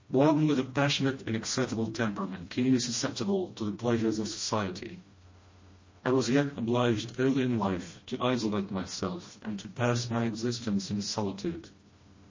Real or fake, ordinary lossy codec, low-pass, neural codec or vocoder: fake; MP3, 32 kbps; 7.2 kHz; codec, 16 kHz, 1 kbps, FreqCodec, smaller model